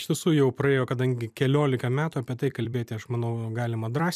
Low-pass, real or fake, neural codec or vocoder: 14.4 kHz; fake; vocoder, 44.1 kHz, 128 mel bands every 256 samples, BigVGAN v2